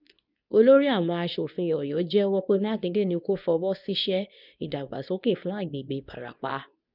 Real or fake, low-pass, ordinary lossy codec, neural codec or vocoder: fake; 5.4 kHz; none; codec, 24 kHz, 0.9 kbps, WavTokenizer, medium speech release version 2